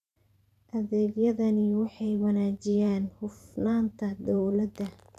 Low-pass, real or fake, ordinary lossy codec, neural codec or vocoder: 14.4 kHz; fake; none; vocoder, 44.1 kHz, 128 mel bands every 512 samples, BigVGAN v2